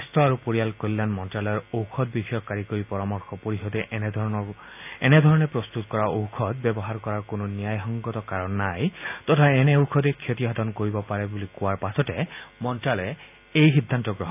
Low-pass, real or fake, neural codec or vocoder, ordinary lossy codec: 3.6 kHz; real; none; AAC, 32 kbps